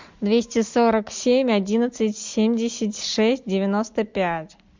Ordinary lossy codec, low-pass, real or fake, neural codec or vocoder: MP3, 64 kbps; 7.2 kHz; real; none